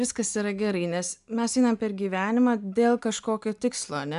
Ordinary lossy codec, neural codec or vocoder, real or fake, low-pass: MP3, 96 kbps; none; real; 10.8 kHz